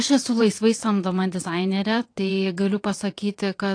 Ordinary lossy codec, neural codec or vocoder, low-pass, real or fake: AAC, 48 kbps; vocoder, 22.05 kHz, 80 mel bands, WaveNeXt; 9.9 kHz; fake